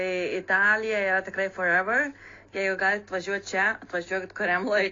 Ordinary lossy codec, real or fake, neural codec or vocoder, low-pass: AAC, 32 kbps; real; none; 7.2 kHz